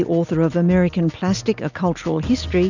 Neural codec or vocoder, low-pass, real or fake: none; 7.2 kHz; real